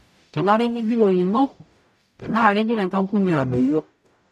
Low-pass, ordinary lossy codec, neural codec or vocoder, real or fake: 14.4 kHz; none; codec, 44.1 kHz, 0.9 kbps, DAC; fake